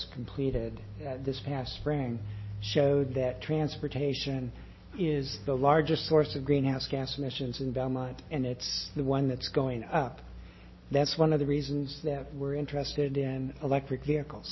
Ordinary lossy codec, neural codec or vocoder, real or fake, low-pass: MP3, 24 kbps; none; real; 7.2 kHz